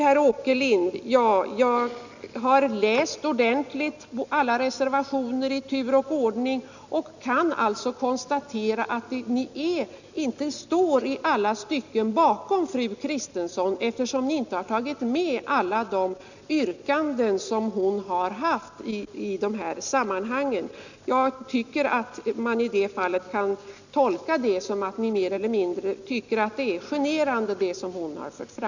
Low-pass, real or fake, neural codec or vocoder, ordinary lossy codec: 7.2 kHz; real; none; none